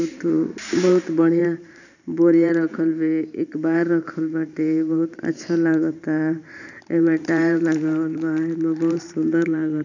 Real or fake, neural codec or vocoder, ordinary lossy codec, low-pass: fake; vocoder, 44.1 kHz, 128 mel bands every 512 samples, BigVGAN v2; none; 7.2 kHz